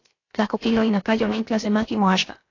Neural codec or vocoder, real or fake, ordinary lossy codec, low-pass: codec, 16 kHz, about 1 kbps, DyCAST, with the encoder's durations; fake; AAC, 32 kbps; 7.2 kHz